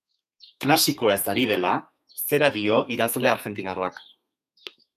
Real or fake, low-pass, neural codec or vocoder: fake; 14.4 kHz; codec, 32 kHz, 1.9 kbps, SNAC